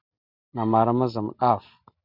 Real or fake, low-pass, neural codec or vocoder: real; 5.4 kHz; none